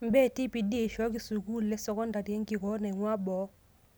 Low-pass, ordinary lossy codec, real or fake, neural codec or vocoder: none; none; real; none